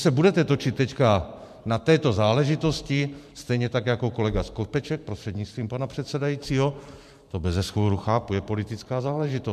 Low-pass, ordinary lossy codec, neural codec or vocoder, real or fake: 14.4 kHz; MP3, 96 kbps; vocoder, 44.1 kHz, 128 mel bands every 512 samples, BigVGAN v2; fake